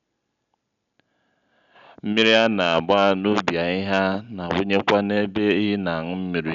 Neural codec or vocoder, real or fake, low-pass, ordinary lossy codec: none; real; 7.2 kHz; none